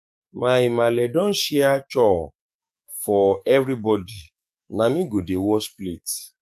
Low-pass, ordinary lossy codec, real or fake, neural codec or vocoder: 14.4 kHz; none; fake; codec, 44.1 kHz, 7.8 kbps, DAC